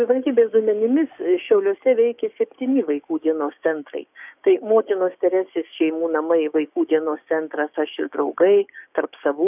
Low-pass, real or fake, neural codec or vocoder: 3.6 kHz; fake; codec, 16 kHz, 6 kbps, DAC